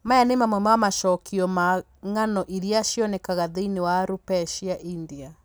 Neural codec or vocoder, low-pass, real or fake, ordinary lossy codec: none; none; real; none